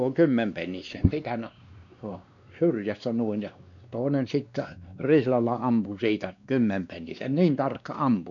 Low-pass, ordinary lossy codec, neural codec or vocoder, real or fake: 7.2 kHz; none; codec, 16 kHz, 2 kbps, X-Codec, WavLM features, trained on Multilingual LibriSpeech; fake